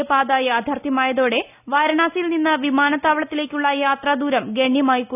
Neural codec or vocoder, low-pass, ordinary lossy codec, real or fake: none; 3.6 kHz; none; real